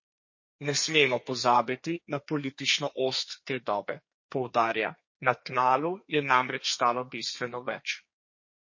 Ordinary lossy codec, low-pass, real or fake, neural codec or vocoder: MP3, 32 kbps; 7.2 kHz; fake; codec, 32 kHz, 1.9 kbps, SNAC